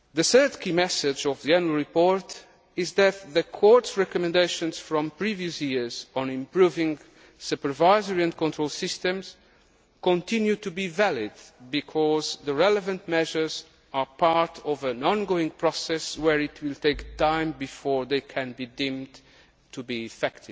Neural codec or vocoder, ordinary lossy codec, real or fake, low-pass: none; none; real; none